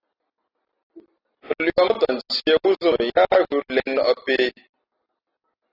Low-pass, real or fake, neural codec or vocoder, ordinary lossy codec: 5.4 kHz; real; none; AAC, 24 kbps